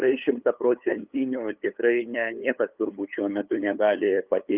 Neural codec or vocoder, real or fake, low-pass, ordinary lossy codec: codec, 16 kHz, 8 kbps, FunCodec, trained on LibriTTS, 25 frames a second; fake; 3.6 kHz; Opus, 32 kbps